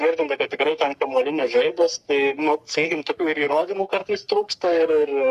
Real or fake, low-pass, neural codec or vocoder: fake; 14.4 kHz; codec, 44.1 kHz, 3.4 kbps, Pupu-Codec